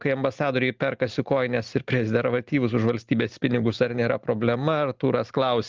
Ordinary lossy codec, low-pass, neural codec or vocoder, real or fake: Opus, 32 kbps; 7.2 kHz; none; real